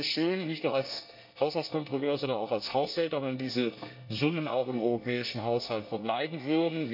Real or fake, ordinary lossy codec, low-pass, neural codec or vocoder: fake; AAC, 48 kbps; 5.4 kHz; codec, 24 kHz, 1 kbps, SNAC